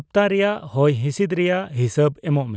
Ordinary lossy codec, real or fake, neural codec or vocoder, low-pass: none; real; none; none